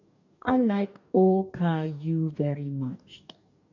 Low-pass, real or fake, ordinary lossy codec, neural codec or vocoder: 7.2 kHz; fake; none; codec, 44.1 kHz, 2.6 kbps, DAC